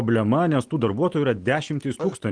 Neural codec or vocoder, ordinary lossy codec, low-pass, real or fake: none; Opus, 24 kbps; 9.9 kHz; real